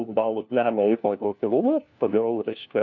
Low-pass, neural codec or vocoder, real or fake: 7.2 kHz; codec, 16 kHz, 1 kbps, FunCodec, trained on LibriTTS, 50 frames a second; fake